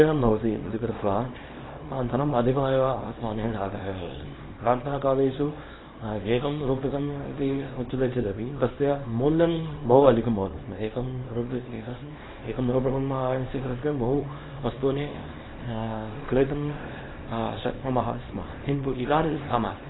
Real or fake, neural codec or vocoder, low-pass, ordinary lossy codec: fake; codec, 24 kHz, 0.9 kbps, WavTokenizer, small release; 7.2 kHz; AAC, 16 kbps